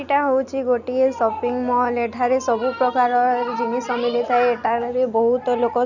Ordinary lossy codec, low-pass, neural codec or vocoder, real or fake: none; 7.2 kHz; none; real